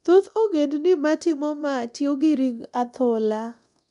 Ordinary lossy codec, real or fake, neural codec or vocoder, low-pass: none; fake; codec, 24 kHz, 0.9 kbps, DualCodec; 10.8 kHz